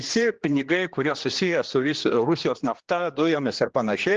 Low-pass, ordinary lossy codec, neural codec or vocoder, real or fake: 7.2 kHz; Opus, 16 kbps; codec, 16 kHz, 2 kbps, X-Codec, HuBERT features, trained on general audio; fake